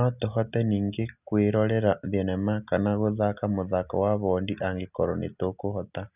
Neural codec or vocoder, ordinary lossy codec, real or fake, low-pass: none; none; real; 3.6 kHz